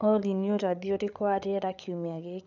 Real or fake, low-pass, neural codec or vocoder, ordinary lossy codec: fake; 7.2 kHz; codec, 16 kHz, 8 kbps, FreqCodec, larger model; none